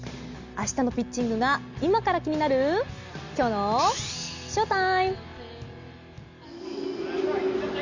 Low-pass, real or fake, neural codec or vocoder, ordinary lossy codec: 7.2 kHz; real; none; Opus, 64 kbps